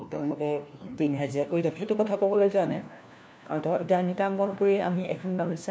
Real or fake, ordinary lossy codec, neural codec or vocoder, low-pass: fake; none; codec, 16 kHz, 1 kbps, FunCodec, trained on LibriTTS, 50 frames a second; none